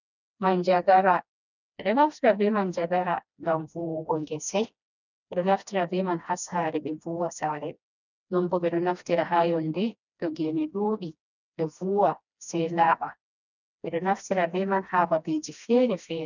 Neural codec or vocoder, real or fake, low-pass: codec, 16 kHz, 1 kbps, FreqCodec, smaller model; fake; 7.2 kHz